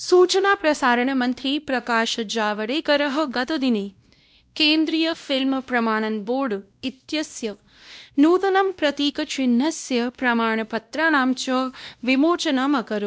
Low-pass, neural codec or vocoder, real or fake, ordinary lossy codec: none; codec, 16 kHz, 1 kbps, X-Codec, WavLM features, trained on Multilingual LibriSpeech; fake; none